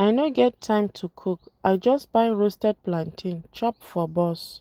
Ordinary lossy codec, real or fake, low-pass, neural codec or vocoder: Opus, 24 kbps; real; 19.8 kHz; none